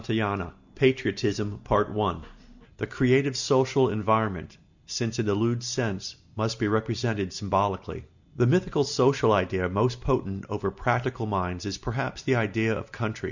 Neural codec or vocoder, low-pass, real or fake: none; 7.2 kHz; real